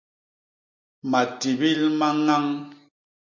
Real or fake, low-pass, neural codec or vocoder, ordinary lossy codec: real; 7.2 kHz; none; MP3, 48 kbps